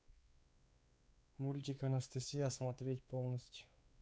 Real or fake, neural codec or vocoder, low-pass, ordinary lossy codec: fake; codec, 16 kHz, 4 kbps, X-Codec, WavLM features, trained on Multilingual LibriSpeech; none; none